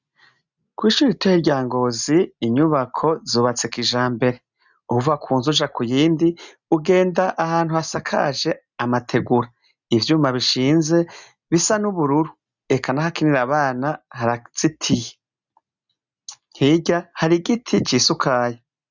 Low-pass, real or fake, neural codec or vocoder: 7.2 kHz; real; none